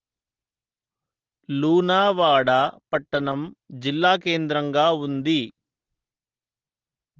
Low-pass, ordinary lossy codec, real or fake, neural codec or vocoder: 7.2 kHz; Opus, 16 kbps; real; none